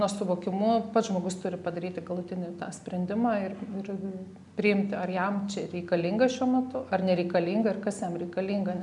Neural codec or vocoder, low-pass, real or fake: none; 10.8 kHz; real